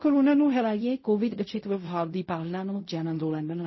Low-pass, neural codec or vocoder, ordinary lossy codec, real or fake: 7.2 kHz; codec, 16 kHz in and 24 kHz out, 0.4 kbps, LongCat-Audio-Codec, fine tuned four codebook decoder; MP3, 24 kbps; fake